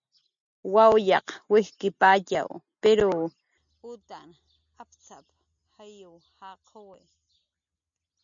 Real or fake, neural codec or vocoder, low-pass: real; none; 7.2 kHz